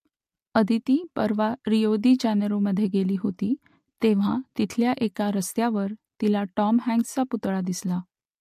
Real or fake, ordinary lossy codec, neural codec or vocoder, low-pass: real; MP3, 64 kbps; none; 14.4 kHz